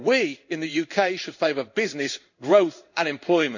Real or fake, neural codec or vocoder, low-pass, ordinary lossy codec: fake; codec, 16 kHz in and 24 kHz out, 1 kbps, XY-Tokenizer; 7.2 kHz; none